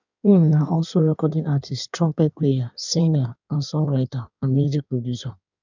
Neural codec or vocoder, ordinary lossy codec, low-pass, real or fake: codec, 16 kHz in and 24 kHz out, 1.1 kbps, FireRedTTS-2 codec; none; 7.2 kHz; fake